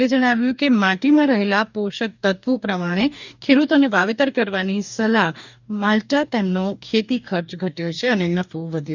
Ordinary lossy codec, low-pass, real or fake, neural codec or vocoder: none; 7.2 kHz; fake; codec, 44.1 kHz, 2.6 kbps, DAC